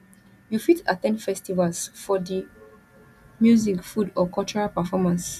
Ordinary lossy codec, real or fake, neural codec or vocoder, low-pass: none; real; none; 14.4 kHz